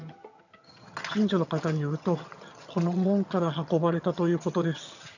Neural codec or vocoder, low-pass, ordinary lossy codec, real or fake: vocoder, 22.05 kHz, 80 mel bands, HiFi-GAN; 7.2 kHz; none; fake